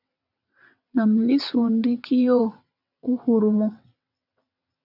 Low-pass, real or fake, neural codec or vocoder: 5.4 kHz; fake; vocoder, 22.05 kHz, 80 mel bands, WaveNeXt